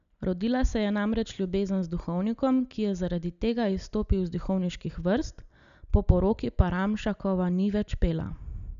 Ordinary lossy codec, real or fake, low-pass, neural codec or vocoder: none; real; 7.2 kHz; none